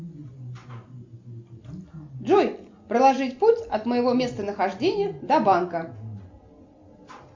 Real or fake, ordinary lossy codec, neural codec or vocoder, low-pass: real; MP3, 64 kbps; none; 7.2 kHz